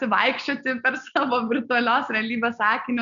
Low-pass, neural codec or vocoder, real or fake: 7.2 kHz; none; real